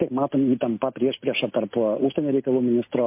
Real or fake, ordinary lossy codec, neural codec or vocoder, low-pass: real; MP3, 24 kbps; none; 3.6 kHz